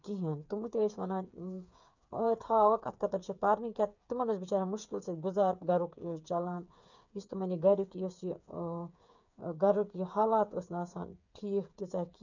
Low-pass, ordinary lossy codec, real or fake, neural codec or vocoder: 7.2 kHz; none; fake; codec, 16 kHz, 8 kbps, FreqCodec, smaller model